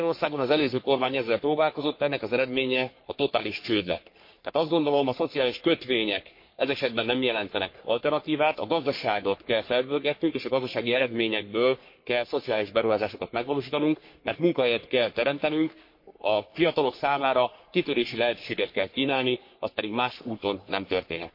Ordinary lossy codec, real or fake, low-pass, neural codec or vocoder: MP3, 32 kbps; fake; 5.4 kHz; codec, 44.1 kHz, 3.4 kbps, Pupu-Codec